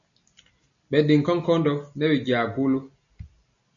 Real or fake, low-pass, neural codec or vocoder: real; 7.2 kHz; none